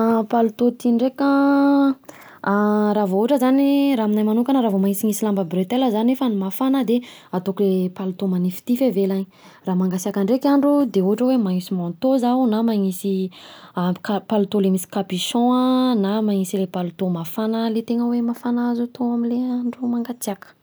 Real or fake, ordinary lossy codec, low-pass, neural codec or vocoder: real; none; none; none